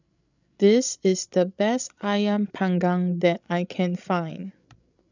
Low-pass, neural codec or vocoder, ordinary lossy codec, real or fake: 7.2 kHz; codec, 16 kHz, 16 kbps, FreqCodec, larger model; none; fake